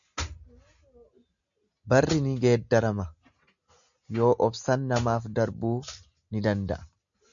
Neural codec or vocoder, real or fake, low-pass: none; real; 7.2 kHz